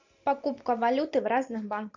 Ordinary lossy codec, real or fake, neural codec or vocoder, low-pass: AAC, 48 kbps; real; none; 7.2 kHz